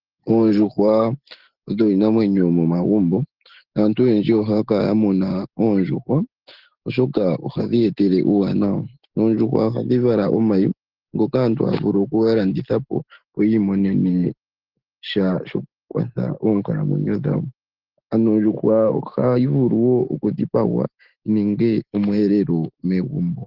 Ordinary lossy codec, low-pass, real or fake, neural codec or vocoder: Opus, 16 kbps; 5.4 kHz; real; none